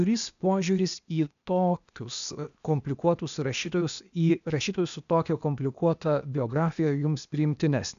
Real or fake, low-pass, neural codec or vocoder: fake; 7.2 kHz; codec, 16 kHz, 0.8 kbps, ZipCodec